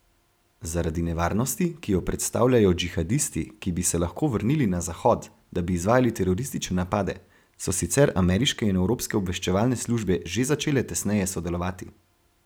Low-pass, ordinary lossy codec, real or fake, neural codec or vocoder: none; none; real; none